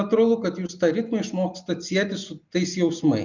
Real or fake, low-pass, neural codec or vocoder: real; 7.2 kHz; none